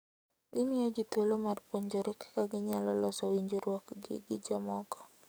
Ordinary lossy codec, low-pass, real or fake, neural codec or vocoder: none; none; fake; codec, 44.1 kHz, 7.8 kbps, DAC